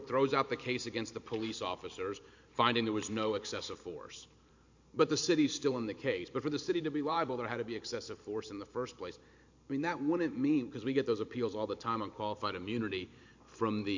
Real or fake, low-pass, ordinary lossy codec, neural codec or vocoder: fake; 7.2 kHz; MP3, 48 kbps; vocoder, 44.1 kHz, 128 mel bands every 512 samples, BigVGAN v2